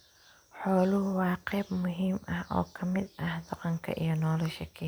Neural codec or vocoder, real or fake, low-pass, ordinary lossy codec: none; real; none; none